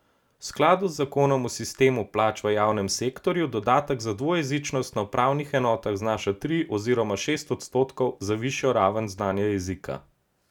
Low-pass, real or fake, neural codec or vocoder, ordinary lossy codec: 19.8 kHz; real; none; none